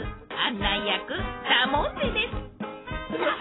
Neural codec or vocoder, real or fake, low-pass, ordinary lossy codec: none; real; 7.2 kHz; AAC, 16 kbps